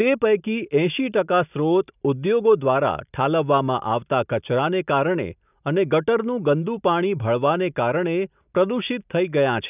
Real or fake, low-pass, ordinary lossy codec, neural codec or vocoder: real; 3.6 kHz; none; none